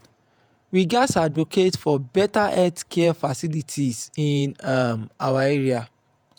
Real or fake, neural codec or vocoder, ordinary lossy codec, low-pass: fake; vocoder, 48 kHz, 128 mel bands, Vocos; none; none